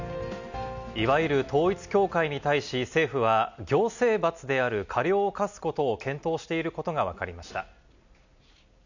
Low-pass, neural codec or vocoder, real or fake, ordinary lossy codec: 7.2 kHz; none; real; none